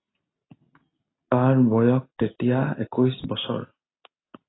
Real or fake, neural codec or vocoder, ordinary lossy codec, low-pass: real; none; AAC, 16 kbps; 7.2 kHz